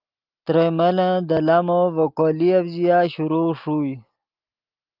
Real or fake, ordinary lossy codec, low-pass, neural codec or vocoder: real; Opus, 32 kbps; 5.4 kHz; none